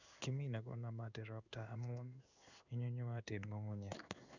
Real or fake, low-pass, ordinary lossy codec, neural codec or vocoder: fake; 7.2 kHz; none; codec, 16 kHz in and 24 kHz out, 1 kbps, XY-Tokenizer